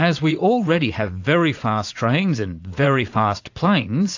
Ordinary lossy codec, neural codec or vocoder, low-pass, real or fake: AAC, 48 kbps; vocoder, 44.1 kHz, 80 mel bands, Vocos; 7.2 kHz; fake